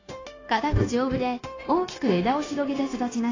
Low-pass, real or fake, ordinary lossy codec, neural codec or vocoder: 7.2 kHz; fake; AAC, 32 kbps; codec, 16 kHz, 0.9 kbps, LongCat-Audio-Codec